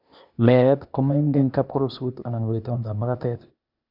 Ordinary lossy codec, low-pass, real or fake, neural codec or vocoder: none; 5.4 kHz; fake; codec, 16 kHz, 0.8 kbps, ZipCodec